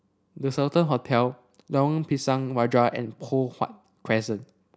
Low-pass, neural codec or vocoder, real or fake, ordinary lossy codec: none; none; real; none